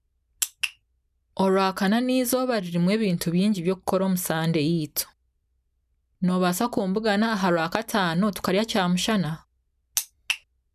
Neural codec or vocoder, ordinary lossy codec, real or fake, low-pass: none; none; real; 14.4 kHz